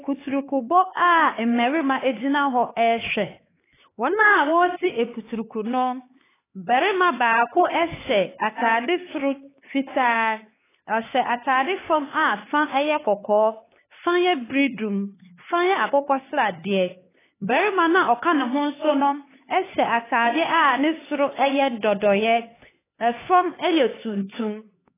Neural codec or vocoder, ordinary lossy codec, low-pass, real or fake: codec, 16 kHz, 4 kbps, X-Codec, HuBERT features, trained on LibriSpeech; AAC, 16 kbps; 3.6 kHz; fake